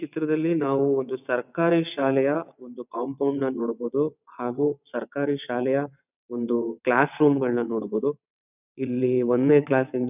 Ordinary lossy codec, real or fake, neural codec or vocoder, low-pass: none; fake; vocoder, 22.05 kHz, 80 mel bands, WaveNeXt; 3.6 kHz